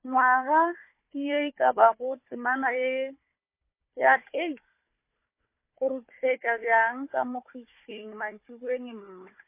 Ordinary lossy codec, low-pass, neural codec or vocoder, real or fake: MP3, 24 kbps; 3.6 kHz; codec, 16 kHz, 4 kbps, FunCodec, trained on Chinese and English, 50 frames a second; fake